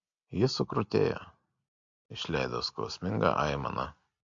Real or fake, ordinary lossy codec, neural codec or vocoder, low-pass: real; MP3, 48 kbps; none; 7.2 kHz